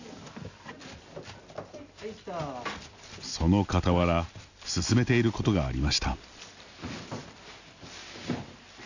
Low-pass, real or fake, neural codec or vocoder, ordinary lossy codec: 7.2 kHz; real; none; none